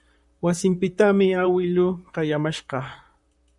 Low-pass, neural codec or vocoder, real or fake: 10.8 kHz; vocoder, 44.1 kHz, 128 mel bands, Pupu-Vocoder; fake